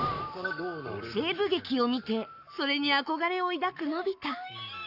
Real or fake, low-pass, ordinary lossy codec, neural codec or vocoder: fake; 5.4 kHz; none; codec, 44.1 kHz, 7.8 kbps, Pupu-Codec